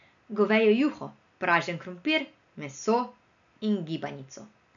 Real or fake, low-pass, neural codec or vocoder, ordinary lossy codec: real; 7.2 kHz; none; none